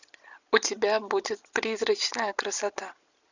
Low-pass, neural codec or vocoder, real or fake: 7.2 kHz; none; real